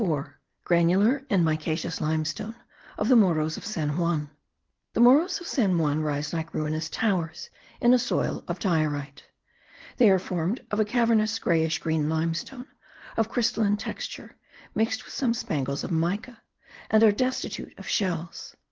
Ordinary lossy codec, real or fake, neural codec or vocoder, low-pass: Opus, 16 kbps; real; none; 7.2 kHz